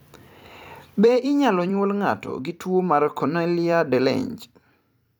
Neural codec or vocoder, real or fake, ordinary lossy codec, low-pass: vocoder, 44.1 kHz, 128 mel bands every 256 samples, BigVGAN v2; fake; none; none